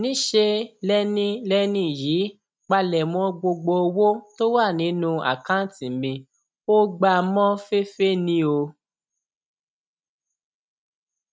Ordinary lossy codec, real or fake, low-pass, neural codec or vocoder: none; real; none; none